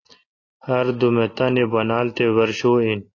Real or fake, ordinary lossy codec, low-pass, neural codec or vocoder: real; AAC, 32 kbps; 7.2 kHz; none